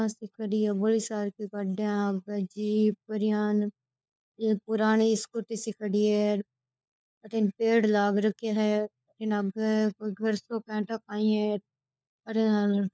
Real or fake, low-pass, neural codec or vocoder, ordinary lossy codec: fake; none; codec, 16 kHz, 4 kbps, FunCodec, trained on LibriTTS, 50 frames a second; none